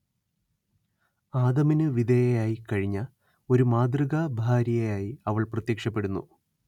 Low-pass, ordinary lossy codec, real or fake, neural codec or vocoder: 19.8 kHz; none; real; none